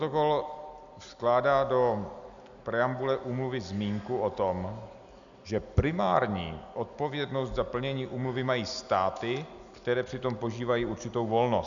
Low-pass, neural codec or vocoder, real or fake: 7.2 kHz; none; real